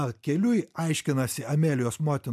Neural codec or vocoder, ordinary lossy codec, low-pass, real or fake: none; AAC, 96 kbps; 14.4 kHz; real